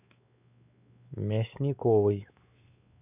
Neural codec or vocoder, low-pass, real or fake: codec, 16 kHz, 4 kbps, X-Codec, WavLM features, trained on Multilingual LibriSpeech; 3.6 kHz; fake